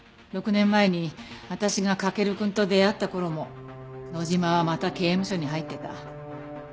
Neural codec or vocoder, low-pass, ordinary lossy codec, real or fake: none; none; none; real